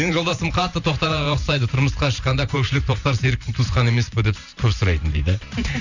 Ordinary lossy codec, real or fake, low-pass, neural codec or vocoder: none; fake; 7.2 kHz; vocoder, 44.1 kHz, 128 mel bands every 512 samples, BigVGAN v2